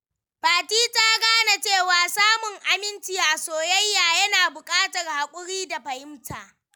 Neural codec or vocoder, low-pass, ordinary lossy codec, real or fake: none; none; none; real